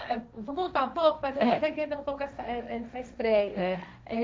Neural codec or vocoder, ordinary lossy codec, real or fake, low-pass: codec, 16 kHz, 1.1 kbps, Voila-Tokenizer; none; fake; none